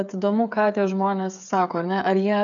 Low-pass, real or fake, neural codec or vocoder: 7.2 kHz; fake; codec, 16 kHz, 8 kbps, FreqCodec, smaller model